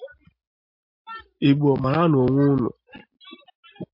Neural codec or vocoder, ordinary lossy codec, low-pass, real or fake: none; MP3, 32 kbps; 5.4 kHz; real